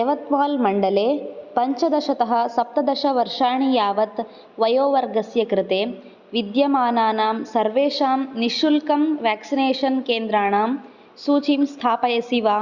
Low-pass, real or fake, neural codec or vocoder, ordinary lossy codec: 7.2 kHz; real; none; Opus, 64 kbps